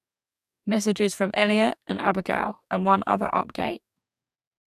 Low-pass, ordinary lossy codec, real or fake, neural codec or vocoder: 14.4 kHz; none; fake; codec, 44.1 kHz, 2.6 kbps, DAC